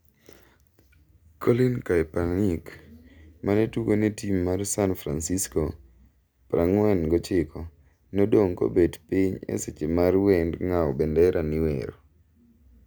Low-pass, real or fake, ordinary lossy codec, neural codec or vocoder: none; real; none; none